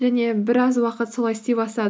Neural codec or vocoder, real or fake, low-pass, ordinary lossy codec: none; real; none; none